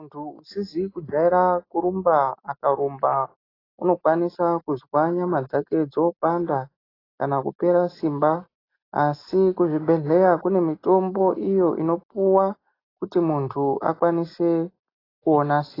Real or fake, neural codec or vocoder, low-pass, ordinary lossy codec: real; none; 5.4 kHz; AAC, 24 kbps